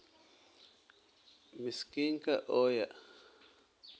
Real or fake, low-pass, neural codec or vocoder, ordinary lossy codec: real; none; none; none